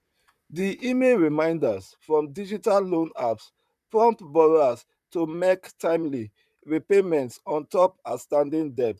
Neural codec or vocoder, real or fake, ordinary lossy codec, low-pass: vocoder, 44.1 kHz, 128 mel bands, Pupu-Vocoder; fake; none; 14.4 kHz